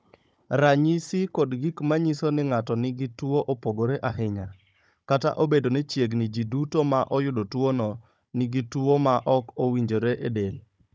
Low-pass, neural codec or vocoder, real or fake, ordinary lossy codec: none; codec, 16 kHz, 16 kbps, FunCodec, trained on Chinese and English, 50 frames a second; fake; none